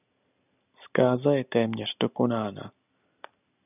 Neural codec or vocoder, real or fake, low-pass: none; real; 3.6 kHz